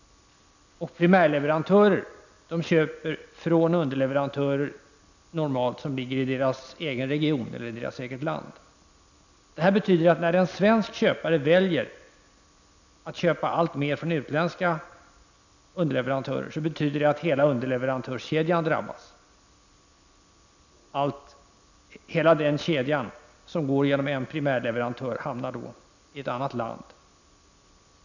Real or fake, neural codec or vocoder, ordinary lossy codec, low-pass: real; none; none; 7.2 kHz